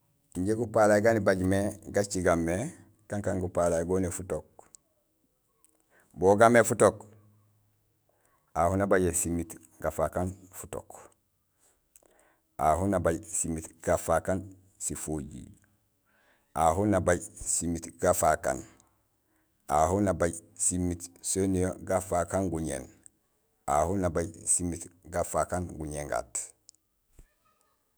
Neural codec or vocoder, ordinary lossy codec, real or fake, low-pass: autoencoder, 48 kHz, 128 numbers a frame, DAC-VAE, trained on Japanese speech; none; fake; none